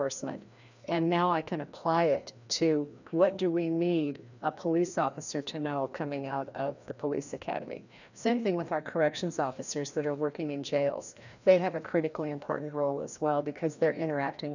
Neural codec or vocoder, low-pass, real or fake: codec, 16 kHz, 1 kbps, FreqCodec, larger model; 7.2 kHz; fake